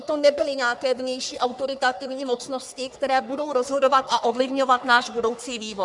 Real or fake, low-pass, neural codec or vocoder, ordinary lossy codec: fake; 10.8 kHz; codec, 44.1 kHz, 3.4 kbps, Pupu-Codec; MP3, 96 kbps